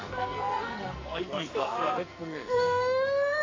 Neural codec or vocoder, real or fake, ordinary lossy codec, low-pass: codec, 44.1 kHz, 2.6 kbps, SNAC; fake; AAC, 32 kbps; 7.2 kHz